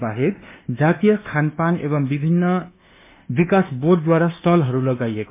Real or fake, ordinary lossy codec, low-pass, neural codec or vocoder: fake; MP3, 32 kbps; 3.6 kHz; codec, 24 kHz, 1.2 kbps, DualCodec